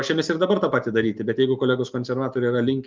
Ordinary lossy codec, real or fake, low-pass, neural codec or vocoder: Opus, 24 kbps; real; 7.2 kHz; none